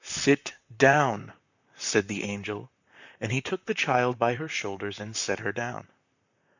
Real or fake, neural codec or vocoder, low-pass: fake; vocoder, 44.1 kHz, 128 mel bands, Pupu-Vocoder; 7.2 kHz